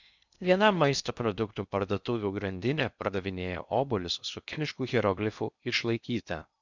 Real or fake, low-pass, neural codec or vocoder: fake; 7.2 kHz; codec, 16 kHz in and 24 kHz out, 0.6 kbps, FocalCodec, streaming, 2048 codes